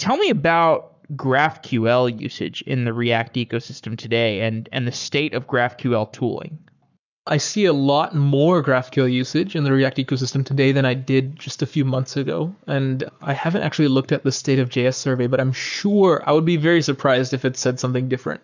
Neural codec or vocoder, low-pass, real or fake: codec, 44.1 kHz, 7.8 kbps, Pupu-Codec; 7.2 kHz; fake